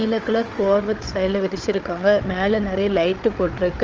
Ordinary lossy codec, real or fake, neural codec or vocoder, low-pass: Opus, 24 kbps; fake; codec, 16 kHz, 8 kbps, FreqCodec, larger model; 7.2 kHz